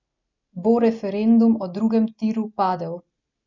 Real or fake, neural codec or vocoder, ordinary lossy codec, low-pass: real; none; none; 7.2 kHz